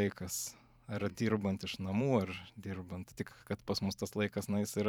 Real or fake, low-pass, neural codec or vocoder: fake; 19.8 kHz; vocoder, 44.1 kHz, 128 mel bands every 512 samples, BigVGAN v2